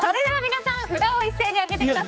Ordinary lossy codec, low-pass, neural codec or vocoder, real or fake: none; none; codec, 16 kHz, 4 kbps, X-Codec, HuBERT features, trained on general audio; fake